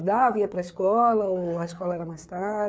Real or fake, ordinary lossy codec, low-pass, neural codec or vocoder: fake; none; none; codec, 16 kHz, 4 kbps, FreqCodec, larger model